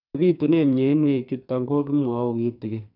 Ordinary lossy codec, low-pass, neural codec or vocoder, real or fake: none; 5.4 kHz; codec, 44.1 kHz, 2.6 kbps, SNAC; fake